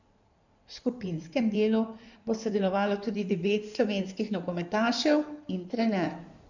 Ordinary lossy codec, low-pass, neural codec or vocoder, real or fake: none; 7.2 kHz; codec, 16 kHz in and 24 kHz out, 2.2 kbps, FireRedTTS-2 codec; fake